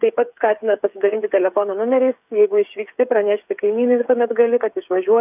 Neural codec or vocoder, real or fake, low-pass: codec, 16 kHz, 8 kbps, FreqCodec, smaller model; fake; 3.6 kHz